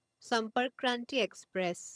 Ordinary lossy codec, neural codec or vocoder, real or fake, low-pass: none; vocoder, 22.05 kHz, 80 mel bands, HiFi-GAN; fake; none